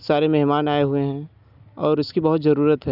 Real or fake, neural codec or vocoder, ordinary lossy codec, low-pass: real; none; none; 5.4 kHz